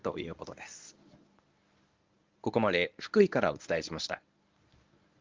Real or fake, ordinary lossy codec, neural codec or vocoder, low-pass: fake; Opus, 24 kbps; codec, 24 kHz, 0.9 kbps, WavTokenizer, medium speech release version 1; 7.2 kHz